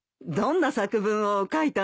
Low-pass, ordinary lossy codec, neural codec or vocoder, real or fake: none; none; none; real